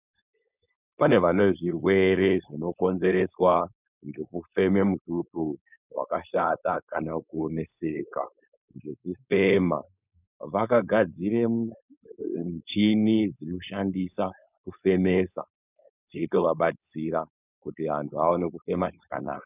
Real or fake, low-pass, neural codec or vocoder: fake; 3.6 kHz; codec, 16 kHz, 4.8 kbps, FACodec